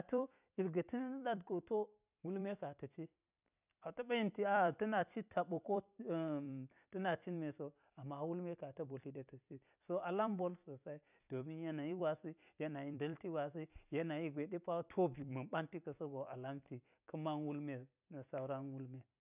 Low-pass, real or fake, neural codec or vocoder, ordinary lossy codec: 3.6 kHz; fake; vocoder, 44.1 kHz, 128 mel bands every 256 samples, BigVGAN v2; none